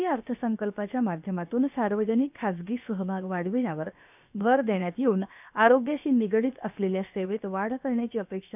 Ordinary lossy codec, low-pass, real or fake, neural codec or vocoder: none; 3.6 kHz; fake; codec, 16 kHz, 0.7 kbps, FocalCodec